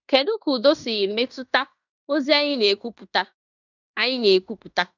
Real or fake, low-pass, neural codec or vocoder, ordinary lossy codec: fake; 7.2 kHz; codec, 16 kHz in and 24 kHz out, 0.9 kbps, LongCat-Audio-Codec, fine tuned four codebook decoder; none